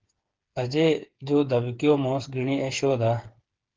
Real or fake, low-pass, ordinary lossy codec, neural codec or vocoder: fake; 7.2 kHz; Opus, 16 kbps; codec, 16 kHz, 8 kbps, FreqCodec, smaller model